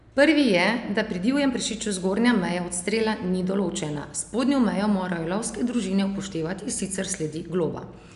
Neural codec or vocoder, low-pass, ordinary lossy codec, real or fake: none; 10.8 kHz; none; real